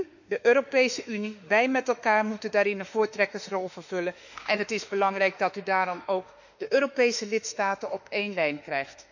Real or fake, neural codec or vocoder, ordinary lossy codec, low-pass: fake; autoencoder, 48 kHz, 32 numbers a frame, DAC-VAE, trained on Japanese speech; none; 7.2 kHz